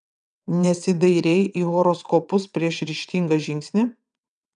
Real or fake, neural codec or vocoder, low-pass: fake; vocoder, 22.05 kHz, 80 mel bands, WaveNeXt; 9.9 kHz